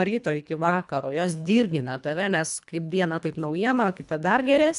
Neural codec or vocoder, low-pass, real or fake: codec, 24 kHz, 1.5 kbps, HILCodec; 10.8 kHz; fake